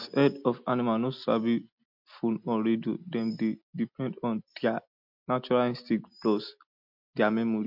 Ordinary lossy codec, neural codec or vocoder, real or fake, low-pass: MP3, 48 kbps; none; real; 5.4 kHz